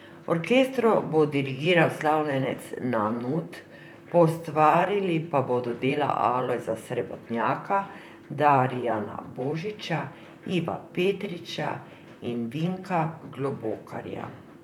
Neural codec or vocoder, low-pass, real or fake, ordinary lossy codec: vocoder, 44.1 kHz, 128 mel bands, Pupu-Vocoder; 19.8 kHz; fake; none